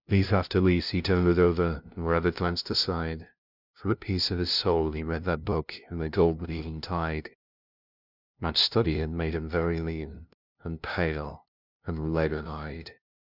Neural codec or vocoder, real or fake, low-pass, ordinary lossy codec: codec, 16 kHz, 0.5 kbps, FunCodec, trained on LibriTTS, 25 frames a second; fake; 5.4 kHz; Opus, 64 kbps